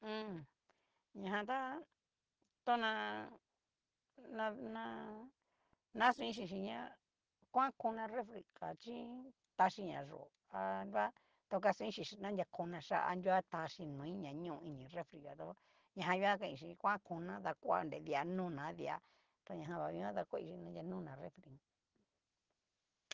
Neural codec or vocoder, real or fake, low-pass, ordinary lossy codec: none; real; 7.2 kHz; Opus, 16 kbps